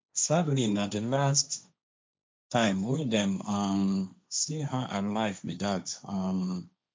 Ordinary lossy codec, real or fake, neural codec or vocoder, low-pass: none; fake; codec, 16 kHz, 1.1 kbps, Voila-Tokenizer; none